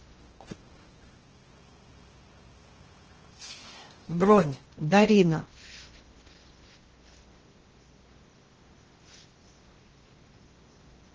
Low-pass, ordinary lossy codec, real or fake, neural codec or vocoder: 7.2 kHz; Opus, 16 kbps; fake; codec, 16 kHz in and 24 kHz out, 0.6 kbps, FocalCodec, streaming, 2048 codes